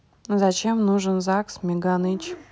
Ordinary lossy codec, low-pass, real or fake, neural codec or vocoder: none; none; real; none